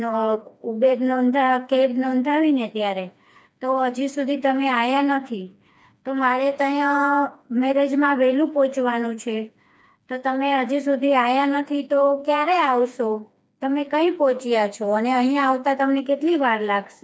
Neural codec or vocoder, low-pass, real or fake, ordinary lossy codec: codec, 16 kHz, 2 kbps, FreqCodec, smaller model; none; fake; none